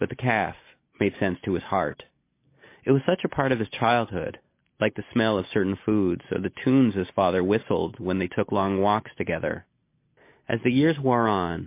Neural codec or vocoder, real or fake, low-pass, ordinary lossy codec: none; real; 3.6 kHz; MP3, 24 kbps